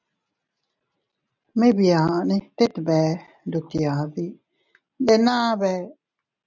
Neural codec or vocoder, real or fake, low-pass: none; real; 7.2 kHz